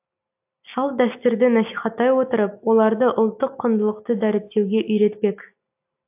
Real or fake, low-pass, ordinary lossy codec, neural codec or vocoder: real; 3.6 kHz; AAC, 32 kbps; none